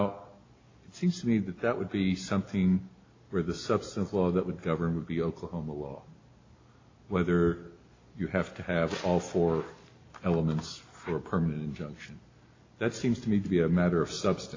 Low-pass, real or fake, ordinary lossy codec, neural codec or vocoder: 7.2 kHz; real; AAC, 32 kbps; none